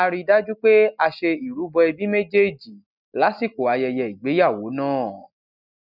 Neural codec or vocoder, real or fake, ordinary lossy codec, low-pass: none; real; none; 5.4 kHz